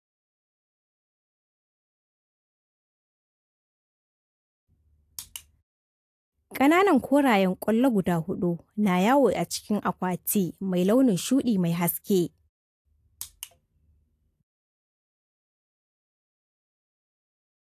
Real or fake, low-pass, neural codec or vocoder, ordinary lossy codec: real; 14.4 kHz; none; AAC, 64 kbps